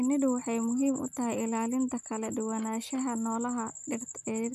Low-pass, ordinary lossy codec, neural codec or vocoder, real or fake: 14.4 kHz; none; none; real